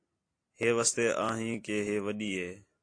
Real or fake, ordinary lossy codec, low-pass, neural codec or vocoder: real; AAC, 48 kbps; 9.9 kHz; none